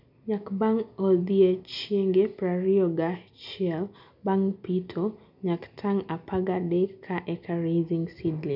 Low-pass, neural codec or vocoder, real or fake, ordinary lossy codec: 5.4 kHz; none; real; none